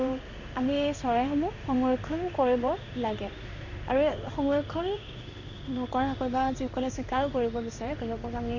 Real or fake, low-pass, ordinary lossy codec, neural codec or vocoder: fake; 7.2 kHz; none; codec, 16 kHz in and 24 kHz out, 1 kbps, XY-Tokenizer